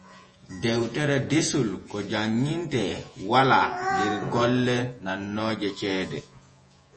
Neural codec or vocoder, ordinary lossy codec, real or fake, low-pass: vocoder, 48 kHz, 128 mel bands, Vocos; MP3, 32 kbps; fake; 10.8 kHz